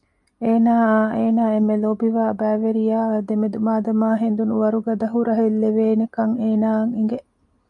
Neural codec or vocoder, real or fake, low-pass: none; real; 10.8 kHz